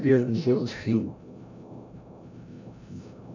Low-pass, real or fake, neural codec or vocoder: 7.2 kHz; fake; codec, 16 kHz, 0.5 kbps, FreqCodec, larger model